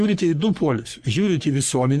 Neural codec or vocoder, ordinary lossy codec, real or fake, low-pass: codec, 44.1 kHz, 3.4 kbps, Pupu-Codec; Opus, 64 kbps; fake; 14.4 kHz